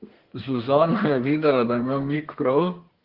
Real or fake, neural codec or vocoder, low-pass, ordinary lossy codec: fake; codec, 32 kHz, 1.9 kbps, SNAC; 5.4 kHz; Opus, 16 kbps